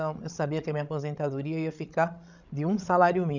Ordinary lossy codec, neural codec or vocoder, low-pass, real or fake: none; codec, 16 kHz, 16 kbps, FreqCodec, larger model; 7.2 kHz; fake